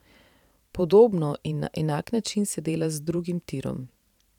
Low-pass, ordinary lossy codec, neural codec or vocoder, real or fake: 19.8 kHz; none; vocoder, 44.1 kHz, 128 mel bands every 512 samples, BigVGAN v2; fake